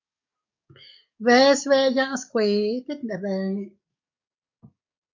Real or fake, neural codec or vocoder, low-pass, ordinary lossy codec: fake; codec, 44.1 kHz, 7.8 kbps, DAC; 7.2 kHz; MP3, 48 kbps